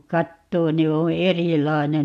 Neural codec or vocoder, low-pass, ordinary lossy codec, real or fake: none; 14.4 kHz; MP3, 96 kbps; real